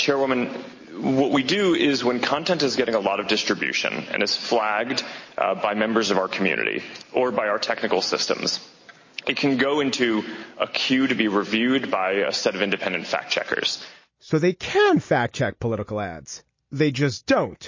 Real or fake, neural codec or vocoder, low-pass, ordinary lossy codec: real; none; 7.2 kHz; MP3, 32 kbps